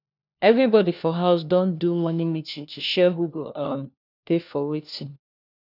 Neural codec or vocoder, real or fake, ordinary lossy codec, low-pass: codec, 16 kHz, 1 kbps, FunCodec, trained on LibriTTS, 50 frames a second; fake; none; 5.4 kHz